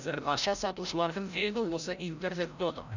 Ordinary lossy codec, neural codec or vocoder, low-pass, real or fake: none; codec, 16 kHz, 0.5 kbps, FreqCodec, larger model; 7.2 kHz; fake